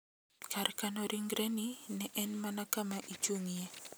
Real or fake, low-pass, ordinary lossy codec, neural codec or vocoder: real; none; none; none